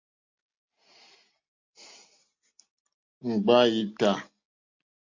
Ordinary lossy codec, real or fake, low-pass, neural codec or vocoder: MP3, 48 kbps; real; 7.2 kHz; none